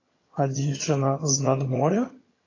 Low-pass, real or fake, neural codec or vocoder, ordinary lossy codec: 7.2 kHz; fake; vocoder, 22.05 kHz, 80 mel bands, HiFi-GAN; AAC, 32 kbps